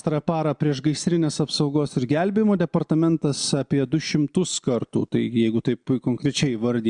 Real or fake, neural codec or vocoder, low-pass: real; none; 9.9 kHz